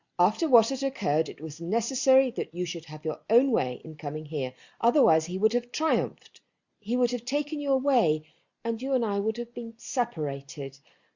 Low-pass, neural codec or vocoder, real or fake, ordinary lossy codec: 7.2 kHz; none; real; Opus, 64 kbps